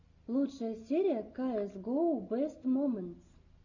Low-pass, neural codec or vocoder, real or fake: 7.2 kHz; none; real